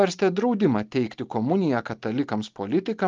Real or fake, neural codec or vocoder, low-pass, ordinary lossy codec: real; none; 7.2 kHz; Opus, 32 kbps